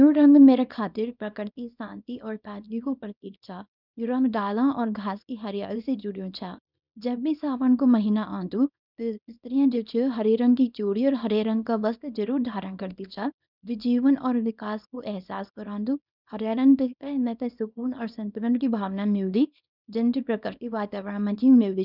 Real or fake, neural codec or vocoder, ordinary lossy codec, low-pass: fake; codec, 24 kHz, 0.9 kbps, WavTokenizer, small release; none; 5.4 kHz